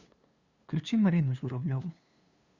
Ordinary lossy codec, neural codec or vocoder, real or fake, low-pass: Opus, 64 kbps; codec, 16 kHz, 2 kbps, FunCodec, trained on LibriTTS, 25 frames a second; fake; 7.2 kHz